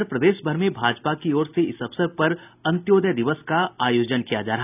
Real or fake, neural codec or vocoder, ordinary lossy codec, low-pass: real; none; none; 3.6 kHz